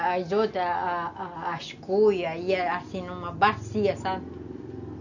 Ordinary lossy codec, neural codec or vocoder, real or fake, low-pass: none; none; real; 7.2 kHz